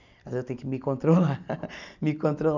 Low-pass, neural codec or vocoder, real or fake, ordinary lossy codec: 7.2 kHz; none; real; none